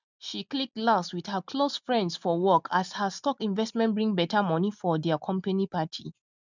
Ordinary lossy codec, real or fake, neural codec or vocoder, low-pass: none; real; none; 7.2 kHz